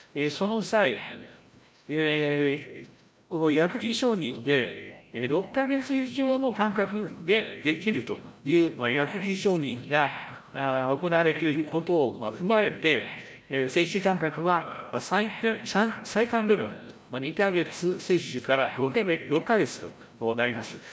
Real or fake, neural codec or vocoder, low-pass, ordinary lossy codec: fake; codec, 16 kHz, 0.5 kbps, FreqCodec, larger model; none; none